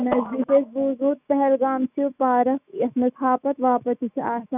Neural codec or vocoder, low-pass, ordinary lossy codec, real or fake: autoencoder, 48 kHz, 128 numbers a frame, DAC-VAE, trained on Japanese speech; 3.6 kHz; none; fake